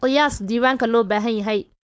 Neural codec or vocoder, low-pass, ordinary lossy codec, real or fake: codec, 16 kHz, 4.8 kbps, FACodec; none; none; fake